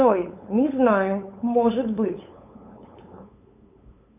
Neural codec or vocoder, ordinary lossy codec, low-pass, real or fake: codec, 16 kHz, 8 kbps, FunCodec, trained on LibriTTS, 25 frames a second; MP3, 32 kbps; 3.6 kHz; fake